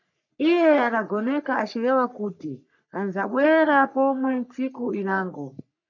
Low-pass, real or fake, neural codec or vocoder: 7.2 kHz; fake; codec, 44.1 kHz, 3.4 kbps, Pupu-Codec